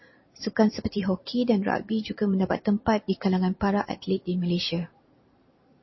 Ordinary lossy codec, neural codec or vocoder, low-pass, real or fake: MP3, 24 kbps; vocoder, 44.1 kHz, 128 mel bands every 512 samples, BigVGAN v2; 7.2 kHz; fake